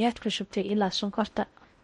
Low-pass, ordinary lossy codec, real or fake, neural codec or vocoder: 10.8 kHz; MP3, 48 kbps; fake; codec, 16 kHz in and 24 kHz out, 0.6 kbps, FocalCodec, streaming, 4096 codes